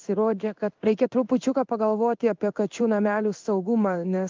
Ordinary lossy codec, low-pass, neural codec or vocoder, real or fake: Opus, 24 kbps; 7.2 kHz; codec, 16 kHz in and 24 kHz out, 1 kbps, XY-Tokenizer; fake